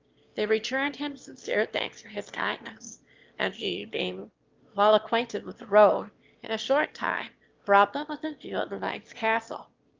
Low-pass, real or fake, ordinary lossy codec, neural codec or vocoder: 7.2 kHz; fake; Opus, 32 kbps; autoencoder, 22.05 kHz, a latent of 192 numbers a frame, VITS, trained on one speaker